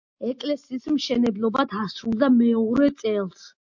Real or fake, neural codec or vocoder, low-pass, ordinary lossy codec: real; none; 7.2 kHz; MP3, 48 kbps